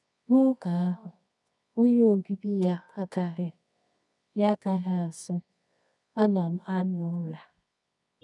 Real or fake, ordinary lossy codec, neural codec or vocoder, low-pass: fake; none; codec, 24 kHz, 0.9 kbps, WavTokenizer, medium music audio release; 10.8 kHz